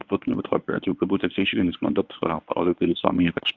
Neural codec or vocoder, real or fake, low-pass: codec, 24 kHz, 0.9 kbps, WavTokenizer, medium speech release version 1; fake; 7.2 kHz